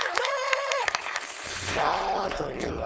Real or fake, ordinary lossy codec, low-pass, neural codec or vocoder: fake; none; none; codec, 16 kHz, 4.8 kbps, FACodec